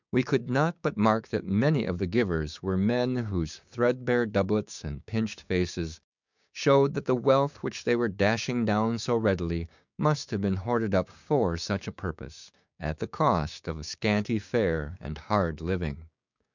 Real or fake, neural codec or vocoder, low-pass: fake; codec, 16 kHz, 6 kbps, DAC; 7.2 kHz